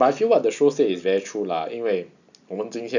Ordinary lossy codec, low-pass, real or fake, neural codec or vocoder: none; 7.2 kHz; real; none